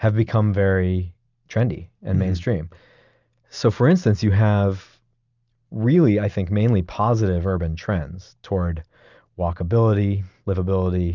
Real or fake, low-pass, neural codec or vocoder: real; 7.2 kHz; none